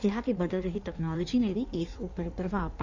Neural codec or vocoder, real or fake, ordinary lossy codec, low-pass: codec, 16 kHz in and 24 kHz out, 1.1 kbps, FireRedTTS-2 codec; fake; none; 7.2 kHz